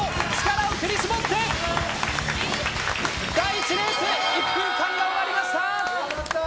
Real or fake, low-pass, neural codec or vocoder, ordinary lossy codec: real; none; none; none